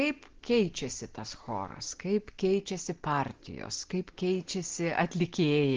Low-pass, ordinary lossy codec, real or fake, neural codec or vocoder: 7.2 kHz; Opus, 16 kbps; real; none